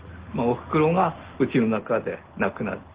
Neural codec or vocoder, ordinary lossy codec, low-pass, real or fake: none; Opus, 16 kbps; 3.6 kHz; real